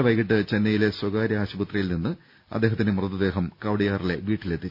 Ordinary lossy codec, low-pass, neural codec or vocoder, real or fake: none; 5.4 kHz; none; real